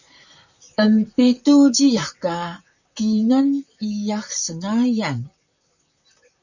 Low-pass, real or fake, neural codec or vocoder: 7.2 kHz; fake; vocoder, 44.1 kHz, 128 mel bands, Pupu-Vocoder